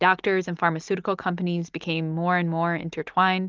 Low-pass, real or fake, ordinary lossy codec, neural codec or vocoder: 7.2 kHz; real; Opus, 24 kbps; none